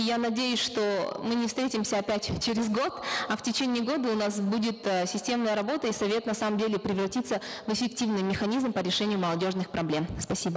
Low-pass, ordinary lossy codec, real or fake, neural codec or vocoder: none; none; real; none